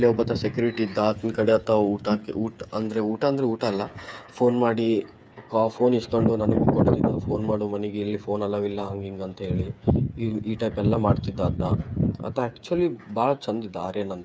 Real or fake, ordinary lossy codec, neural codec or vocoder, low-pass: fake; none; codec, 16 kHz, 8 kbps, FreqCodec, smaller model; none